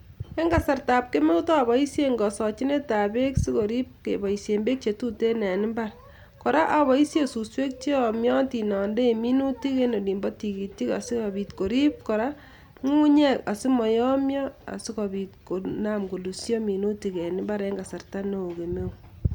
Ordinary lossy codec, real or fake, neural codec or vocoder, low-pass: none; real; none; 19.8 kHz